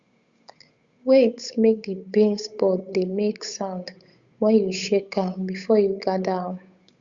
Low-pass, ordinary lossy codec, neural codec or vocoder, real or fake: 7.2 kHz; Opus, 64 kbps; codec, 16 kHz, 8 kbps, FunCodec, trained on Chinese and English, 25 frames a second; fake